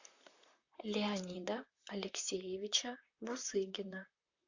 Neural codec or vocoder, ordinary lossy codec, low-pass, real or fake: vocoder, 44.1 kHz, 128 mel bands, Pupu-Vocoder; AAC, 48 kbps; 7.2 kHz; fake